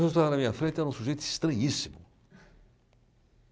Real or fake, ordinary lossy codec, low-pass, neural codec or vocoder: real; none; none; none